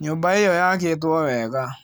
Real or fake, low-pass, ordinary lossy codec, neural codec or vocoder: real; none; none; none